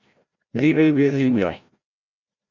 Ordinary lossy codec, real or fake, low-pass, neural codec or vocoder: Opus, 64 kbps; fake; 7.2 kHz; codec, 16 kHz, 0.5 kbps, FreqCodec, larger model